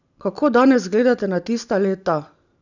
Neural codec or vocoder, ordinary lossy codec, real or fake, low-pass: none; none; real; 7.2 kHz